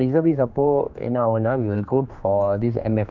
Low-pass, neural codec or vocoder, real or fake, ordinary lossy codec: 7.2 kHz; codec, 16 kHz, 2 kbps, X-Codec, HuBERT features, trained on general audio; fake; none